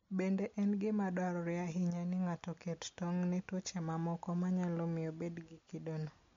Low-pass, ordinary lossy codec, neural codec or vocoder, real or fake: 7.2 kHz; MP3, 48 kbps; none; real